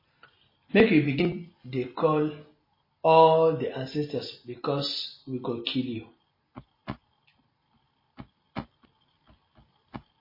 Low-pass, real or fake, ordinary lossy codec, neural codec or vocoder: 5.4 kHz; real; MP3, 24 kbps; none